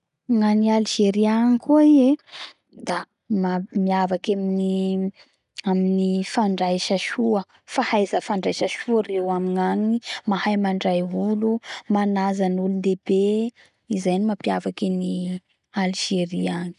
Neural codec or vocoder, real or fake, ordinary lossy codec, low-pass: none; real; none; 10.8 kHz